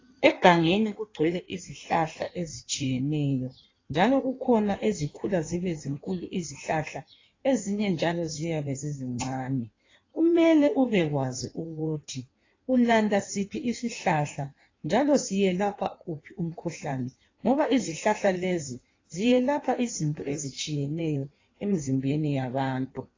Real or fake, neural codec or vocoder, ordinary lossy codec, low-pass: fake; codec, 16 kHz in and 24 kHz out, 1.1 kbps, FireRedTTS-2 codec; AAC, 32 kbps; 7.2 kHz